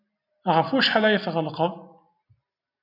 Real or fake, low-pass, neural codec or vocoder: real; 5.4 kHz; none